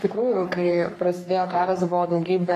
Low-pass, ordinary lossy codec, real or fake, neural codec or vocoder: 14.4 kHz; AAC, 48 kbps; fake; codec, 44.1 kHz, 3.4 kbps, Pupu-Codec